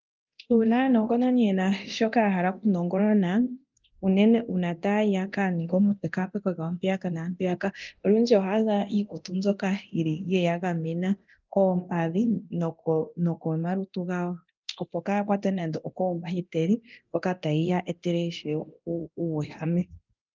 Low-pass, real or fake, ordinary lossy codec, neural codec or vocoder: 7.2 kHz; fake; Opus, 32 kbps; codec, 24 kHz, 0.9 kbps, DualCodec